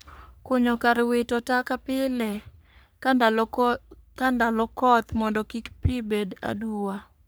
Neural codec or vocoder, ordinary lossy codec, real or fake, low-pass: codec, 44.1 kHz, 3.4 kbps, Pupu-Codec; none; fake; none